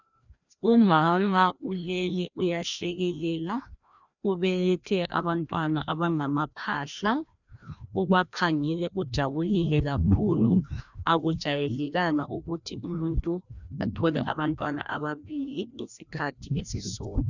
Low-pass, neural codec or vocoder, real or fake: 7.2 kHz; codec, 16 kHz, 1 kbps, FreqCodec, larger model; fake